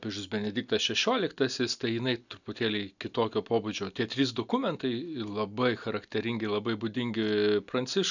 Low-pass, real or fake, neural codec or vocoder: 7.2 kHz; real; none